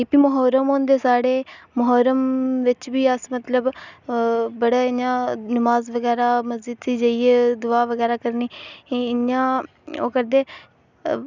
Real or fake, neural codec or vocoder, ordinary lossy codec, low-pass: real; none; none; 7.2 kHz